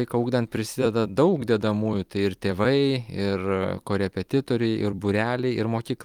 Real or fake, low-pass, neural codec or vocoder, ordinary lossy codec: fake; 19.8 kHz; vocoder, 44.1 kHz, 128 mel bands every 256 samples, BigVGAN v2; Opus, 32 kbps